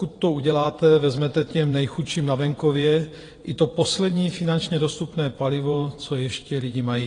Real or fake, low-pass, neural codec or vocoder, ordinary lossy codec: fake; 9.9 kHz; vocoder, 22.05 kHz, 80 mel bands, WaveNeXt; AAC, 32 kbps